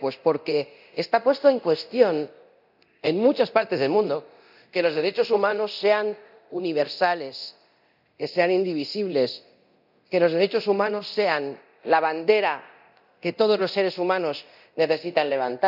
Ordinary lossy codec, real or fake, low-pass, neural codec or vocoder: none; fake; 5.4 kHz; codec, 24 kHz, 0.9 kbps, DualCodec